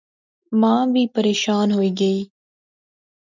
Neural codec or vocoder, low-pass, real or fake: none; 7.2 kHz; real